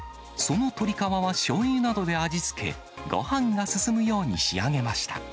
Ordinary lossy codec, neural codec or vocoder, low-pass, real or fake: none; none; none; real